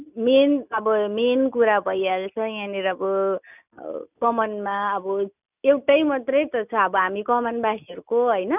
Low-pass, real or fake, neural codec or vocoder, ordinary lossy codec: 3.6 kHz; real; none; none